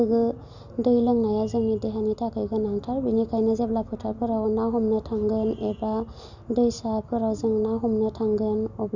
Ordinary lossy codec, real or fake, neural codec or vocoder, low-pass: none; real; none; 7.2 kHz